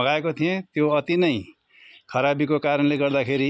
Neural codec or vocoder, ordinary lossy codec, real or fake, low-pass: none; none; real; none